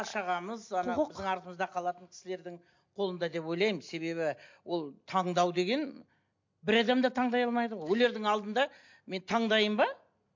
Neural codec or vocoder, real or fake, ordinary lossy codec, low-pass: none; real; MP3, 48 kbps; 7.2 kHz